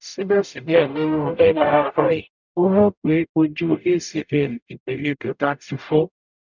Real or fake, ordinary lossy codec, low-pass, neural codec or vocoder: fake; none; 7.2 kHz; codec, 44.1 kHz, 0.9 kbps, DAC